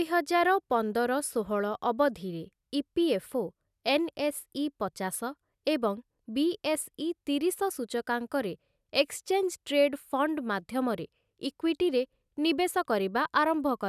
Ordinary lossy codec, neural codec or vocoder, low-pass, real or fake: none; none; 14.4 kHz; real